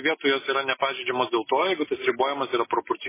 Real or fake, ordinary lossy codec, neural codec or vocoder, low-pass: real; MP3, 16 kbps; none; 3.6 kHz